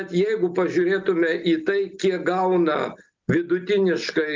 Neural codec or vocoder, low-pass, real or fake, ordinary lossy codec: none; 7.2 kHz; real; Opus, 32 kbps